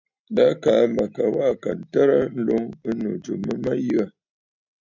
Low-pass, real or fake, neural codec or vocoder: 7.2 kHz; real; none